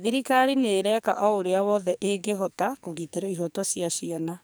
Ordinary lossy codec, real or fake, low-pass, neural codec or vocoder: none; fake; none; codec, 44.1 kHz, 2.6 kbps, SNAC